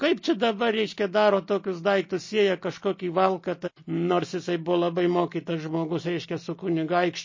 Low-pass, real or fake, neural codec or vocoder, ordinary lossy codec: 7.2 kHz; real; none; MP3, 32 kbps